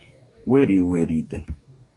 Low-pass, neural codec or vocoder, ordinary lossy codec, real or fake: 10.8 kHz; codec, 44.1 kHz, 2.6 kbps, DAC; AAC, 48 kbps; fake